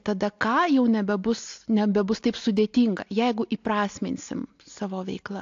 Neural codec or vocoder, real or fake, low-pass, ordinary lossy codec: none; real; 7.2 kHz; AAC, 48 kbps